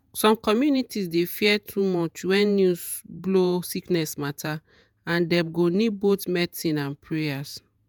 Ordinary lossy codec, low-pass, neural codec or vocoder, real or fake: none; none; vocoder, 48 kHz, 128 mel bands, Vocos; fake